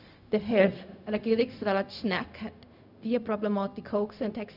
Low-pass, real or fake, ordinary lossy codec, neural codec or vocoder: 5.4 kHz; fake; none; codec, 16 kHz, 0.4 kbps, LongCat-Audio-Codec